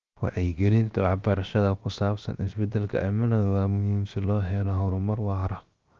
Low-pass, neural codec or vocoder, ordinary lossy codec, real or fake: 7.2 kHz; codec, 16 kHz, 0.7 kbps, FocalCodec; Opus, 24 kbps; fake